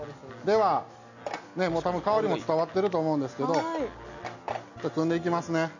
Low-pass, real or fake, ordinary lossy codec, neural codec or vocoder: 7.2 kHz; real; none; none